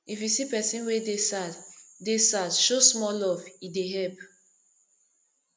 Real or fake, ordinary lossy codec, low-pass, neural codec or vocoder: real; none; none; none